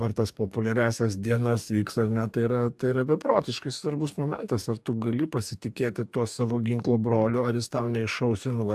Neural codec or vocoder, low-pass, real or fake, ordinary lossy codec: codec, 44.1 kHz, 2.6 kbps, DAC; 14.4 kHz; fake; AAC, 96 kbps